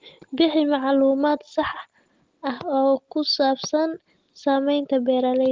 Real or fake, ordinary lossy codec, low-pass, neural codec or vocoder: real; Opus, 16 kbps; 7.2 kHz; none